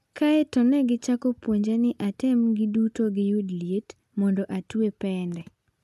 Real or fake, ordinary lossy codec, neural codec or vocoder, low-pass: real; none; none; 14.4 kHz